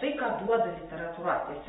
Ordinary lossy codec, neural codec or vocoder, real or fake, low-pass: AAC, 16 kbps; none; real; 19.8 kHz